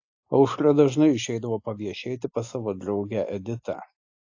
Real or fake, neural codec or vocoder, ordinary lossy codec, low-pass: real; none; AAC, 48 kbps; 7.2 kHz